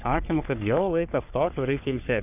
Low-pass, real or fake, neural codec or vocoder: 3.6 kHz; fake; codec, 44.1 kHz, 3.4 kbps, Pupu-Codec